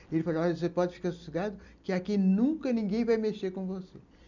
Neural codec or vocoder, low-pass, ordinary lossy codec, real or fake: none; 7.2 kHz; none; real